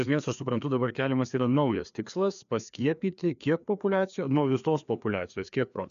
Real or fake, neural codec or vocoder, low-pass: fake; codec, 16 kHz, 2 kbps, FreqCodec, larger model; 7.2 kHz